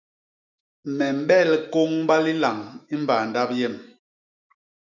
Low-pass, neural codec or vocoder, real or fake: 7.2 kHz; autoencoder, 48 kHz, 128 numbers a frame, DAC-VAE, trained on Japanese speech; fake